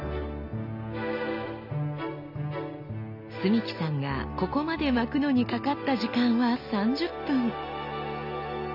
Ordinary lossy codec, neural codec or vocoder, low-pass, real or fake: none; none; 5.4 kHz; real